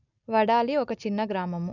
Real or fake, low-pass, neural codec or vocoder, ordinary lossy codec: real; 7.2 kHz; none; none